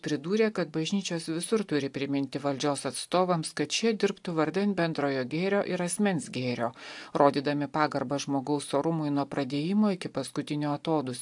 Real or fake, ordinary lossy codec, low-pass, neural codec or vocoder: real; AAC, 64 kbps; 10.8 kHz; none